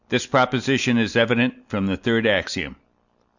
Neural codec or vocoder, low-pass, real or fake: none; 7.2 kHz; real